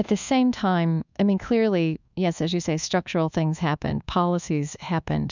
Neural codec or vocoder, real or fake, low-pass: codec, 24 kHz, 1.2 kbps, DualCodec; fake; 7.2 kHz